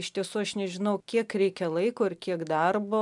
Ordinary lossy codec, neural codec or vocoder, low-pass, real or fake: MP3, 96 kbps; none; 10.8 kHz; real